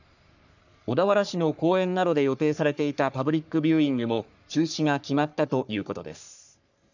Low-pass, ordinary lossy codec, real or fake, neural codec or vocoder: 7.2 kHz; none; fake; codec, 44.1 kHz, 3.4 kbps, Pupu-Codec